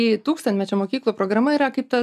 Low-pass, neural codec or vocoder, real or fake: 14.4 kHz; none; real